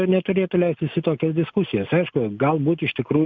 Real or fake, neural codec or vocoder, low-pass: real; none; 7.2 kHz